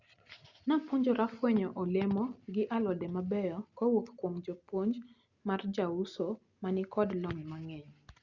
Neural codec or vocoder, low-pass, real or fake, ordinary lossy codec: none; 7.2 kHz; real; Opus, 64 kbps